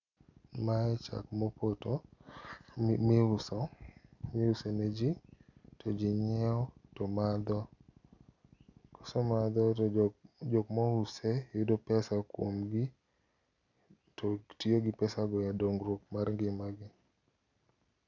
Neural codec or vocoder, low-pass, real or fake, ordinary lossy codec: none; 7.2 kHz; real; none